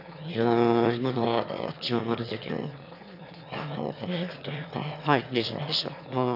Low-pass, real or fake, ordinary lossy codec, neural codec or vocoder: 5.4 kHz; fake; none; autoencoder, 22.05 kHz, a latent of 192 numbers a frame, VITS, trained on one speaker